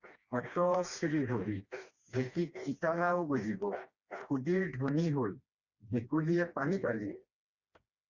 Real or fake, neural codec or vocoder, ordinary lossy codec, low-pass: fake; codec, 16 kHz, 2 kbps, FreqCodec, smaller model; Opus, 64 kbps; 7.2 kHz